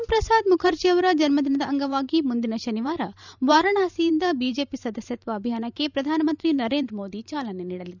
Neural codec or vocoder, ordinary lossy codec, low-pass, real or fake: vocoder, 44.1 kHz, 128 mel bands every 512 samples, BigVGAN v2; none; 7.2 kHz; fake